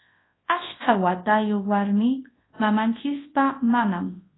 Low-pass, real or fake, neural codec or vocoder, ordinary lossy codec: 7.2 kHz; fake; codec, 24 kHz, 0.9 kbps, WavTokenizer, large speech release; AAC, 16 kbps